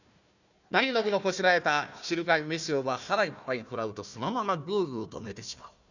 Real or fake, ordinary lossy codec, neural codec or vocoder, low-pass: fake; none; codec, 16 kHz, 1 kbps, FunCodec, trained on Chinese and English, 50 frames a second; 7.2 kHz